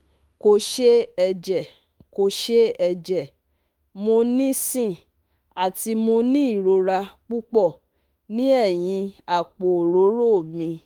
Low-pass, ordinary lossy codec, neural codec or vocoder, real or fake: 19.8 kHz; Opus, 32 kbps; autoencoder, 48 kHz, 32 numbers a frame, DAC-VAE, trained on Japanese speech; fake